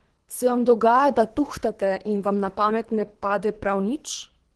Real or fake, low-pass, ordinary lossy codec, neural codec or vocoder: fake; 10.8 kHz; Opus, 16 kbps; codec, 24 kHz, 3 kbps, HILCodec